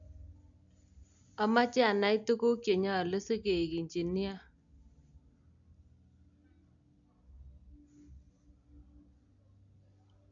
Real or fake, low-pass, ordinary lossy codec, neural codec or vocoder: real; 7.2 kHz; AAC, 64 kbps; none